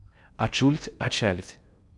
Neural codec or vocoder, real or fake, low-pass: codec, 16 kHz in and 24 kHz out, 0.6 kbps, FocalCodec, streaming, 4096 codes; fake; 10.8 kHz